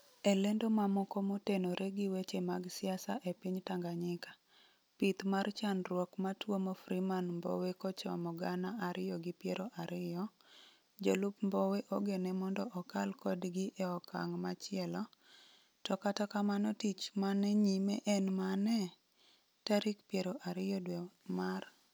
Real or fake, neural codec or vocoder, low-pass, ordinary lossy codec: real; none; none; none